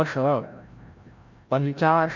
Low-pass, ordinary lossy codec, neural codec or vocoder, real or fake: 7.2 kHz; MP3, 48 kbps; codec, 16 kHz, 0.5 kbps, FreqCodec, larger model; fake